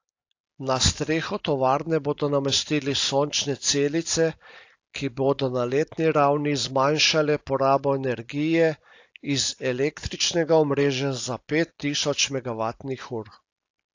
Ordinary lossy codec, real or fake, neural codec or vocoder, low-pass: AAC, 48 kbps; real; none; 7.2 kHz